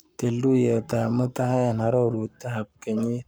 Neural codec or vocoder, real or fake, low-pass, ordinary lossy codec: codec, 44.1 kHz, 7.8 kbps, Pupu-Codec; fake; none; none